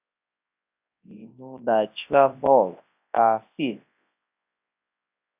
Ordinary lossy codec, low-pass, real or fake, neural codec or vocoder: AAC, 32 kbps; 3.6 kHz; fake; codec, 24 kHz, 0.9 kbps, WavTokenizer, large speech release